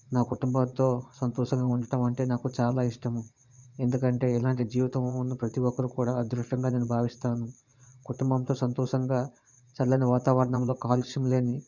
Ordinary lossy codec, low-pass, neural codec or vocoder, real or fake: none; 7.2 kHz; vocoder, 22.05 kHz, 80 mel bands, Vocos; fake